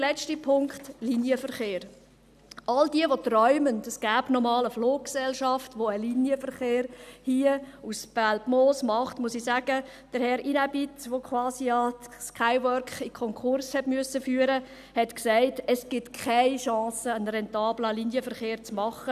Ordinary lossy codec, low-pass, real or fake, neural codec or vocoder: none; 14.4 kHz; real; none